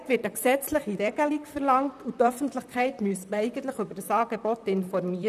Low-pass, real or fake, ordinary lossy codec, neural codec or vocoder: 14.4 kHz; fake; none; vocoder, 44.1 kHz, 128 mel bands, Pupu-Vocoder